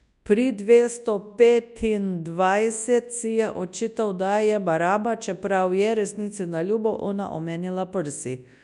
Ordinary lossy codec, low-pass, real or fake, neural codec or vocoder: none; 10.8 kHz; fake; codec, 24 kHz, 0.9 kbps, WavTokenizer, large speech release